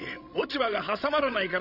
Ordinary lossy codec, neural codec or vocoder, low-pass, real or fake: none; codec, 16 kHz, 16 kbps, FreqCodec, larger model; 5.4 kHz; fake